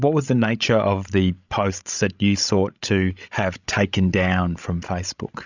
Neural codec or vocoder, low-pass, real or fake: codec, 16 kHz, 16 kbps, FreqCodec, larger model; 7.2 kHz; fake